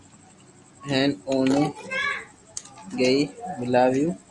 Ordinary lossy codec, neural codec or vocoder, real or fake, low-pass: Opus, 64 kbps; none; real; 10.8 kHz